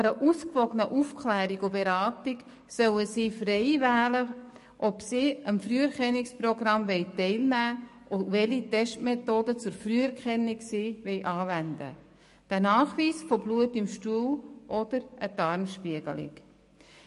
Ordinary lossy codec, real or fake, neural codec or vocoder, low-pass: MP3, 48 kbps; fake; codec, 44.1 kHz, 7.8 kbps, DAC; 14.4 kHz